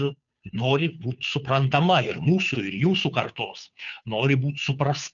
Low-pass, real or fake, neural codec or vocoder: 7.2 kHz; fake; codec, 16 kHz, 2 kbps, FunCodec, trained on Chinese and English, 25 frames a second